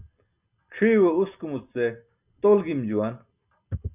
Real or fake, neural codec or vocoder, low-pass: real; none; 3.6 kHz